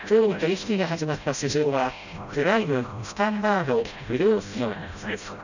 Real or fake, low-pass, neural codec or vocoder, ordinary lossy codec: fake; 7.2 kHz; codec, 16 kHz, 0.5 kbps, FreqCodec, smaller model; none